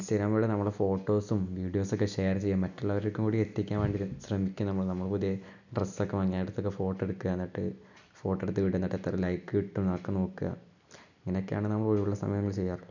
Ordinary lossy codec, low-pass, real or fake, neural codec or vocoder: none; 7.2 kHz; real; none